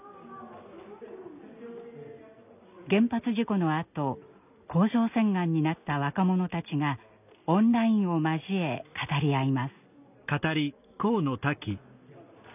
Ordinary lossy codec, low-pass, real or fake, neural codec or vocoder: none; 3.6 kHz; real; none